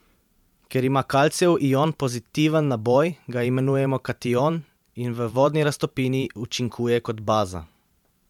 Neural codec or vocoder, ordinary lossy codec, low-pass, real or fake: vocoder, 44.1 kHz, 128 mel bands every 512 samples, BigVGAN v2; MP3, 96 kbps; 19.8 kHz; fake